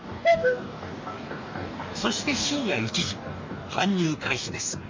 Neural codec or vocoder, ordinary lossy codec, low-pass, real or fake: codec, 44.1 kHz, 2.6 kbps, DAC; MP3, 48 kbps; 7.2 kHz; fake